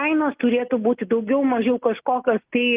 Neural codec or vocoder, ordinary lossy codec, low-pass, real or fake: none; Opus, 24 kbps; 3.6 kHz; real